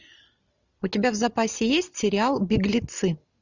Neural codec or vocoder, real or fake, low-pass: none; real; 7.2 kHz